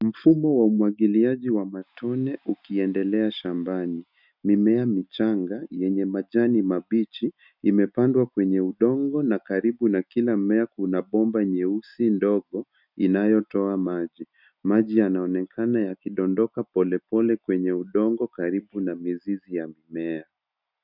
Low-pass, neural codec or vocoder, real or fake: 5.4 kHz; none; real